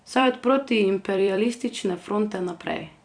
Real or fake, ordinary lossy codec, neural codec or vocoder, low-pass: fake; Opus, 64 kbps; vocoder, 44.1 kHz, 128 mel bands every 256 samples, BigVGAN v2; 9.9 kHz